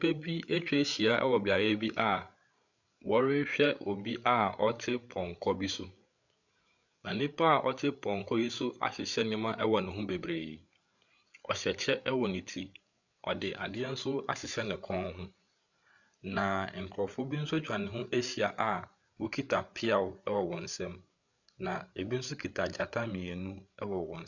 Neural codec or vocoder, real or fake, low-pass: codec, 16 kHz, 8 kbps, FreqCodec, larger model; fake; 7.2 kHz